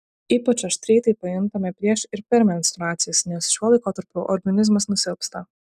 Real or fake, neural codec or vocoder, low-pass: real; none; 14.4 kHz